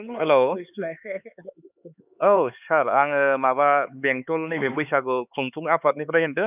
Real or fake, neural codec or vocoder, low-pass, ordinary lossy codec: fake; codec, 16 kHz, 4 kbps, X-Codec, HuBERT features, trained on LibriSpeech; 3.6 kHz; none